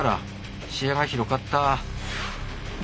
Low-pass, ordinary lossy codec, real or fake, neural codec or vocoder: none; none; real; none